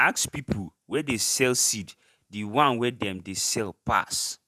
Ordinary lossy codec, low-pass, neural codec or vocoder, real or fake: none; 14.4 kHz; vocoder, 48 kHz, 128 mel bands, Vocos; fake